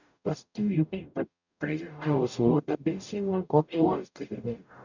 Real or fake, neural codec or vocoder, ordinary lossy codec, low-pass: fake; codec, 44.1 kHz, 0.9 kbps, DAC; none; 7.2 kHz